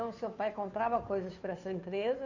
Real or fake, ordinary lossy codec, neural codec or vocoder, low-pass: fake; none; codec, 16 kHz, 2 kbps, FunCodec, trained on Chinese and English, 25 frames a second; 7.2 kHz